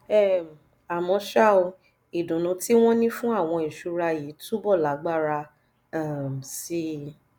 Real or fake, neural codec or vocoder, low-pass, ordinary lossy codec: real; none; none; none